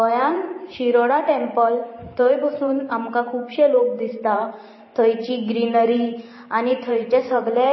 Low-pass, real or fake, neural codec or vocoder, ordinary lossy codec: 7.2 kHz; fake; vocoder, 44.1 kHz, 128 mel bands every 512 samples, BigVGAN v2; MP3, 24 kbps